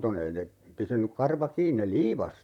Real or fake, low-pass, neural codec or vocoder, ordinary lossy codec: fake; 19.8 kHz; vocoder, 44.1 kHz, 128 mel bands, Pupu-Vocoder; none